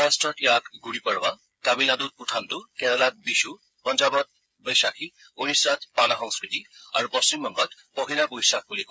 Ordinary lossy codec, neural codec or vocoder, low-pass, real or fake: none; codec, 16 kHz, 8 kbps, FreqCodec, smaller model; none; fake